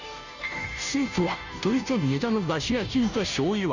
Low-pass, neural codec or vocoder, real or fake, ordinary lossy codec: 7.2 kHz; codec, 16 kHz, 0.5 kbps, FunCodec, trained on Chinese and English, 25 frames a second; fake; none